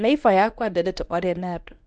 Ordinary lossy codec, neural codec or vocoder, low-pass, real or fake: none; codec, 24 kHz, 0.9 kbps, WavTokenizer, medium speech release version 1; none; fake